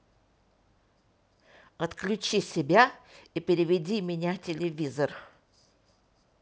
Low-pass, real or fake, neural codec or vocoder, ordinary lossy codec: none; real; none; none